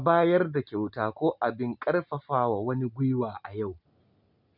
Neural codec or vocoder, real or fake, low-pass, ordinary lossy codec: none; real; 5.4 kHz; none